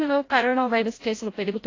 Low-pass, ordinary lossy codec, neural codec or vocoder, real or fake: 7.2 kHz; AAC, 32 kbps; codec, 16 kHz, 0.5 kbps, FreqCodec, larger model; fake